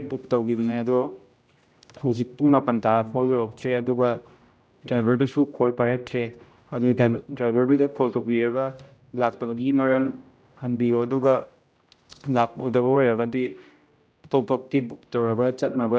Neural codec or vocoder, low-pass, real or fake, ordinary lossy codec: codec, 16 kHz, 0.5 kbps, X-Codec, HuBERT features, trained on general audio; none; fake; none